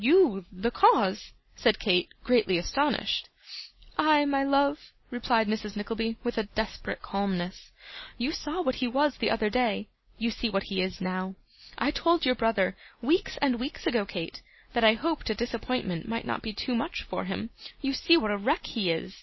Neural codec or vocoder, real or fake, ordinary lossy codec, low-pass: none; real; MP3, 24 kbps; 7.2 kHz